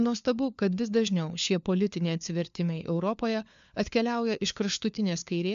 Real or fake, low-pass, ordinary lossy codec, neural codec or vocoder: fake; 7.2 kHz; MP3, 64 kbps; codec, 16 kHz, 4 kbps, FunCodec, trained on LibriTTS, 50 frames a second